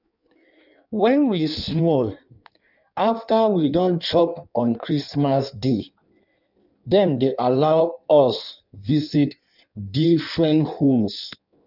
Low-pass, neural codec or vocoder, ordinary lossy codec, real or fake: 5.4 kHz; codec, 16 kHz in and 24 kHz out, 1.1 kbps, FireRedTTS-2 codec; none; fake